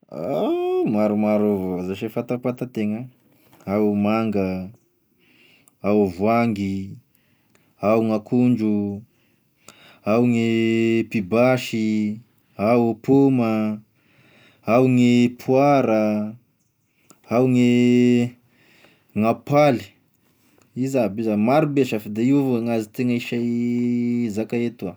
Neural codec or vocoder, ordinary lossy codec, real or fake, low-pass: none; none; real; none